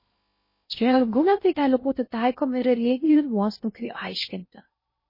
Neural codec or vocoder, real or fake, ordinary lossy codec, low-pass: codec, 16 kHz in and 24 kHz out, 0.6 kbps, FocalCodec, streaming, 2048 codes; fake; MP3, 24 kbps; 5.4 kHz